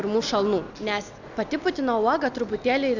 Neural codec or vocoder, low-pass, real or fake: none; 7.2 kHz; real